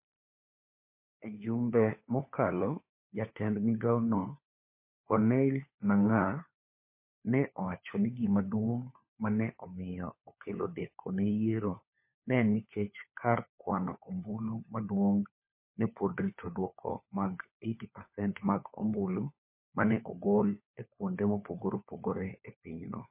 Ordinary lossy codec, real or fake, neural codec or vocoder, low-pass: MP3, 24 kbps; fake; codec, 16 kHz, 4 kbps, FunCodec, trained on LibriTTS, 50 frames a second; 3.6 kHz